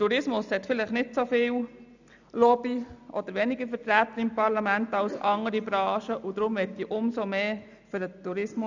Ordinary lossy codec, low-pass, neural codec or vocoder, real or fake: none; 7.2 kHz; none; real